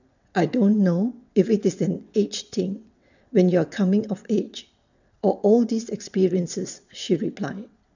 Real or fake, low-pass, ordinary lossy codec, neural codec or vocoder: fake; 7.2 kHz; none; vocoder, 22.05 kHz, 80 mel bands, WaveNeXt